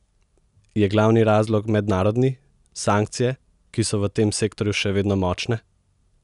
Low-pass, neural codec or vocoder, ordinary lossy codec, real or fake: 10.8 kHz; none; none; real